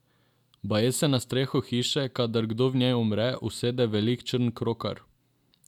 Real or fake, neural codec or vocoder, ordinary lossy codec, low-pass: real; none; none; 19.8 kHz